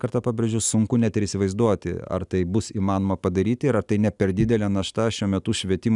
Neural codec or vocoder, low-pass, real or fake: none; 10.8 kHz; real